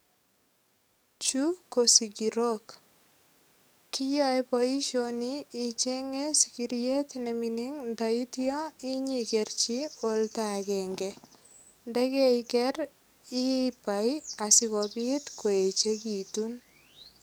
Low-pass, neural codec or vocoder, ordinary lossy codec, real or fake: none; codec, 44.1 kHz, 7.8 kbps, DAC; none; fake